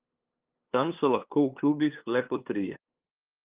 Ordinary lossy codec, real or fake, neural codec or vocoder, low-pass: Opus, 32 kbps; fake; codec, 16 kHz, 2 kbps, FunCodec, trained on LibriTTS, 25 frames a second; 3.6 kHz